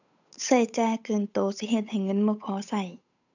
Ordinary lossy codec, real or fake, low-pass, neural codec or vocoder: none; fake; 7.2 kHz; codec, 16 kHz, 8 kbps, FunCodec, trained on Chinese and English, 25 frames a second